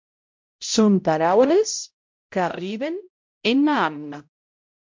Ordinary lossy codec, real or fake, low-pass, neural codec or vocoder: MP3, 48 kbps; fake; 7.2 kHz; codec, 16 kHz, 0.5 kbps, X-Codec, HuBERT features, trained on balanced general audio